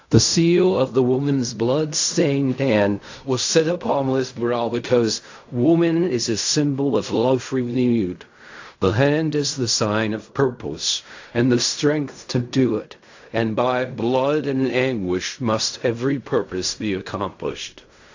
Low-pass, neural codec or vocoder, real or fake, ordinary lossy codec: 7.2 kHz; codec, 16 kHz in and 24 kHz out, 0.4 kbps, LongCat-Audio-Codec, fine tuned four codebook decoder; fake; AAC, 48 kbps